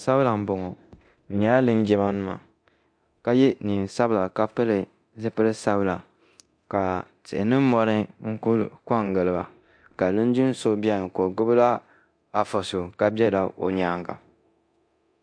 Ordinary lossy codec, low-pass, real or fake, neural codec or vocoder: MP3, 64 kbps; 9.9 kHz; fake; codec, 24 kHz, 0.9 kbps, DualCodec